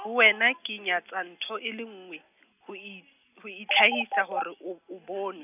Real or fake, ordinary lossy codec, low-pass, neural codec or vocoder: real; none; 3.6 kHz; none